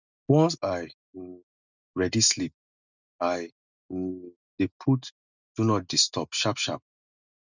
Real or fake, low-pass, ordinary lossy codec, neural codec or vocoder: real; 7.2 kHz; none; none